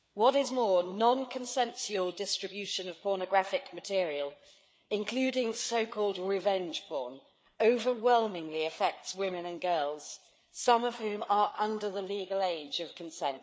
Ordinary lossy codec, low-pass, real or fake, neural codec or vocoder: none; none; fake; codec, 16 kHz, 4 kbps, FreqCodec, larger model